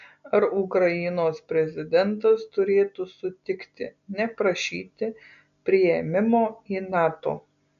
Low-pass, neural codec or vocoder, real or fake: 7.2 kHz; none; real